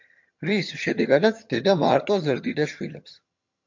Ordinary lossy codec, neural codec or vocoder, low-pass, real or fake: MP3, 48 kbps; vocoder, 22.05 kHz, 80 mel bands, HiFi-GAN; 7.2 kHz; fake